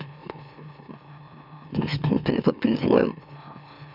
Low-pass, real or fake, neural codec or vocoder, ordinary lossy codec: 5.4 kHz; fake; autoencoder, 44.1 kHz, a latent of 192 numbers a frame, MeloTTS; none